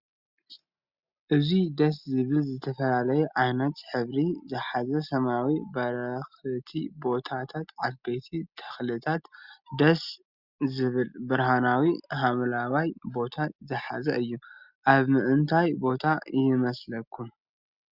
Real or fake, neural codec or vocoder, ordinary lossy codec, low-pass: real; none; Opus, 64 kbps; 5.4 kHz